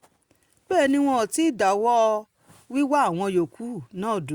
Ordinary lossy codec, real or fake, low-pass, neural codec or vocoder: Opus, 64 kbps; real; 19.8 kHz; none